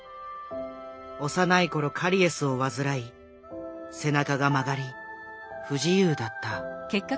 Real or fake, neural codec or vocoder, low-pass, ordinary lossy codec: real; none; none; none